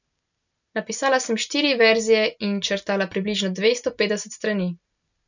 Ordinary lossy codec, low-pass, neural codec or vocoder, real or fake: none; 7.2 kHz; none; real